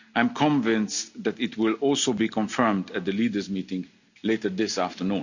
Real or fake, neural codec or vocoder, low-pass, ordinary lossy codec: real; none; 7.2 kHz; none